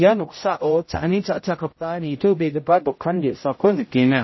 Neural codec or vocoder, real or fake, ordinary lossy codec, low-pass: codec, 16 kHz, 0.5 kbps, X-Codec, HuBERT features, trained on general audio; fake; MP3, 24 kbps; 7.2 kHz